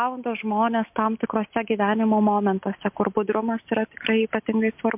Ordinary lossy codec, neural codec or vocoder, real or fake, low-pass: MP3, 32 kbps; none; real; 3.6 kHz